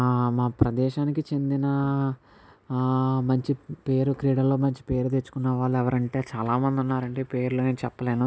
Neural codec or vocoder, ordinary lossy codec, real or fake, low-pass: none; none; real; none